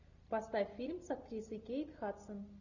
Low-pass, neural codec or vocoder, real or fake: 7.2 kHz; none; real